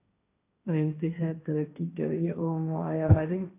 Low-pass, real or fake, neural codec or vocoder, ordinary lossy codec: 3.6 kHz; fake; codec, 16 kHz, 1.1 kbps, Voila-Tokenizer; AAC, 24 kbps